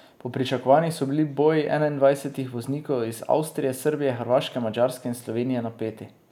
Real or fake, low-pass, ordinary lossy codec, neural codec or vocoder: real; 19.8 kHz; none; none